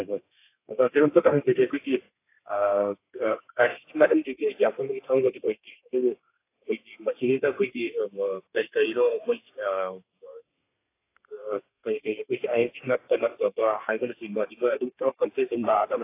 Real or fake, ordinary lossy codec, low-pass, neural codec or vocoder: fake; AAC, 24 kbps; 3.6 kHz; codec, 32 kHz, 1.9 kbps, SNAC